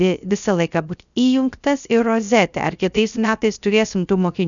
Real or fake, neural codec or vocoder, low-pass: fake; codec, 16 kHz, 0.3 kbps, FocalCodec; 7.2 kHz